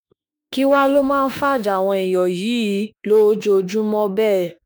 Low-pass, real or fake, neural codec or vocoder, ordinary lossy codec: none; fake; autoencoder, 48 kHz, 32 numbers a frame, DAC-VAE, trained on Japanese speech; none